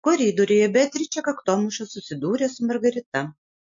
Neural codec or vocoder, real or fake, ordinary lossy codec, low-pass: none; real; MP3, 48 kbps; 7.2 kHz